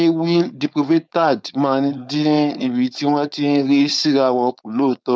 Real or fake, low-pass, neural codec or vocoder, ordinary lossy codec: fake; none; codec, 16 kHz, 4.8 kbps, FACodec; none